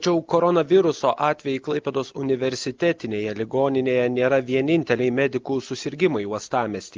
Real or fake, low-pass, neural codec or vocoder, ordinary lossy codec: real; 7.2 kHz; none; Opus, 24 kbps